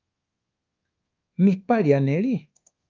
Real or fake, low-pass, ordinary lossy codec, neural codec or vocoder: fake; 7.2 kHz; Opus, 32 kbps; codec, 24 kHz, 1.2 kbps, DualCodec